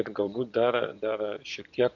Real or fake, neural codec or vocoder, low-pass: fake; vocoder, 24 kHz, 100 mel bands, Vocos; 7.2 kHz